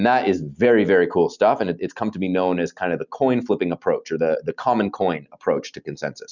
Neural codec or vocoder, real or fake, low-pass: none; real; 7.2 kHz